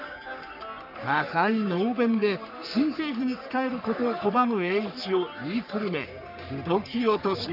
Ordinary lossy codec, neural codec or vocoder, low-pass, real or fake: none; codec, 44.1 kHz, 3.4 kbps, Pupu-Codec; 5.4 kHz; fake